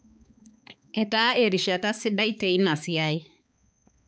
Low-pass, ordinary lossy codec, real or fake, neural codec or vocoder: none; none; fake; codec, 16 kHz, 4 kbps, X-Codec, HuBERT features, trained on balanced general audio